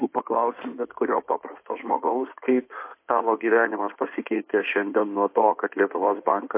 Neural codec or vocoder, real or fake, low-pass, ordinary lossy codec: codec, 16 kHz in and 24 kHz out, 2.2 kbps, FireRedTTS-2 codec; fake; 3.6 kHz; MP3, 24 kbps